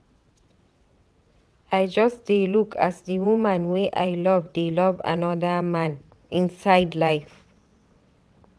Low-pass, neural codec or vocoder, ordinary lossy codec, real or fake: none; vocoder, 22.05 kHz, 80 mel bands, WaveNeXt; none; fake